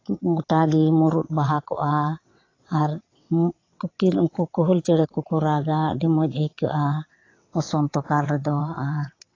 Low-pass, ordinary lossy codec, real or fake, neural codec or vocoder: 7.2 kHz; AAC, 32 kbps; fake; vocoder, 22.05 kHz, 80 mel bands, WaveNeXt